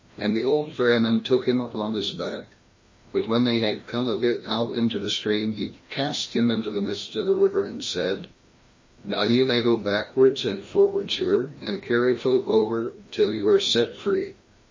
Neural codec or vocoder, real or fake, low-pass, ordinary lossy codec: codec, 16 kHz, 1 kbps, FreqCodec, larger model; fake; 7.2 kHz; MP3, 32 kbps